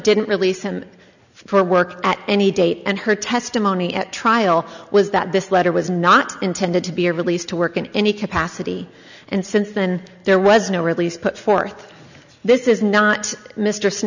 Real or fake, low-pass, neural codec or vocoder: real; 7.2 kHz; none